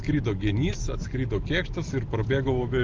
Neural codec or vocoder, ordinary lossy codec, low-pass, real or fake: none; Opus, 32 kbps; 7.2 kHz; real